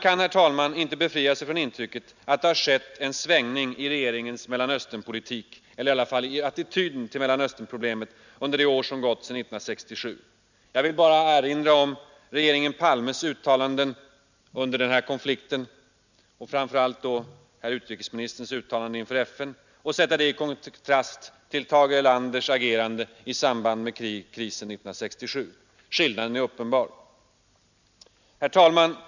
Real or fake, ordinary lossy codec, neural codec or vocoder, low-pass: real; none; none; 7.2 kHz